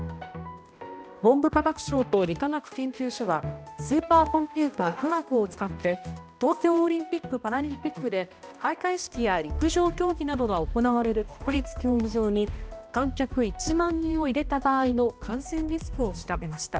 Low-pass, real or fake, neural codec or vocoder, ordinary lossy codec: none; fake; codec, 16 kHz, 1 kbps, X-Codec, HuBERT features, trained on balanced general audio; none